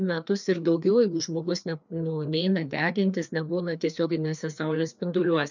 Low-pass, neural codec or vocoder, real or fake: 7.2 kHz; codec, 16 kHz, 2 kbps, FreqCodec, larger model; fake